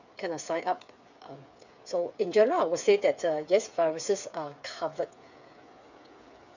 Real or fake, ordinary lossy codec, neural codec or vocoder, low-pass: fake; none; codec, 16 kHz, 8 kbps, FreqCodec, smaller model; 7.2 kHz